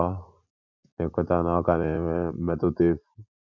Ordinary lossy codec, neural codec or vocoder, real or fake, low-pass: none; vocoder, 44.1 kHz, 80 mel bands, Vocos; fake; 7.2 kHz